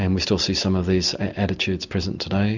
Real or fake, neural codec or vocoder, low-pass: real; none; 7.2 kHz